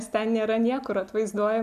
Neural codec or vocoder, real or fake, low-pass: none; real; 14.4 kHz